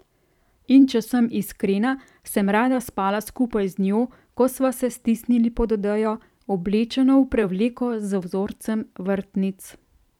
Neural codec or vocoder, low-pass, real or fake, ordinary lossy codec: vocoder, 44.1 kHz, 128 mel bands every 512 samples, BigVGAN v2; 19.8 kHz; fake; none